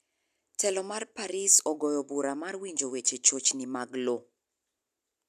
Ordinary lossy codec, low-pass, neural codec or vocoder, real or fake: MP3, 96 kbps; 14.4 kHz; none; real